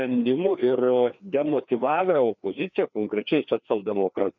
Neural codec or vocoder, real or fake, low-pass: codec, 16 kHz, 2 kbps, FreqCodec, larger model; fake; 7.2 kHz